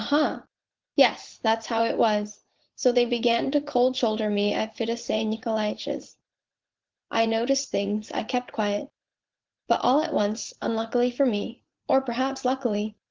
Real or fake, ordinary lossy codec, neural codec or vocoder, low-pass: fake; Opus, 16 kbps; vocoder, 44.1 kHz, 80 mel bands, Vocos; 7.2 kHz